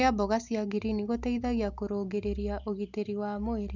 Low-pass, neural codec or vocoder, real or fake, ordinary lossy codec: 7.2 kHz; none; real; none